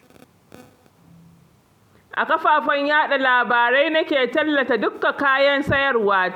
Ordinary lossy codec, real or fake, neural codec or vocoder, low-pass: none; real; none; 19.8 kHz